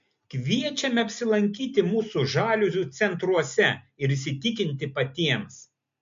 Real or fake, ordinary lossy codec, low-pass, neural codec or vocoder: real; MP3, 48 kbps; 7.2 kHz; none